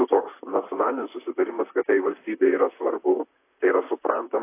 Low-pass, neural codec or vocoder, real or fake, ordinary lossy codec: 3.6 kHz; vocoder, 44.1 kHz, 128 mel bands, Pupu-Vocoder; fake; AAC, 24 kbps